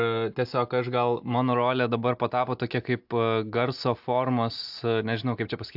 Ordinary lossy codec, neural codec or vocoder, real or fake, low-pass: AAC, 48 kbps; none; real; 5.4 kHz